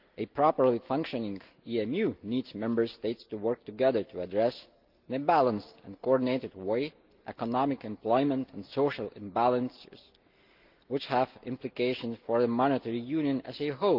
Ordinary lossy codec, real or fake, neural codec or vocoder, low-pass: Opus, 32 kbps; real; none; 5.4 kHz